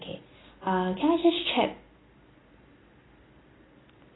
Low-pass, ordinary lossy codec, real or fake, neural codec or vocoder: 7.2 kHz; AAC, 16 kbps; real; none